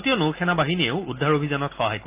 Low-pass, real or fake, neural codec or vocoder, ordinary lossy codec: 3.6 kHz; real; none; Opus, 24 kbps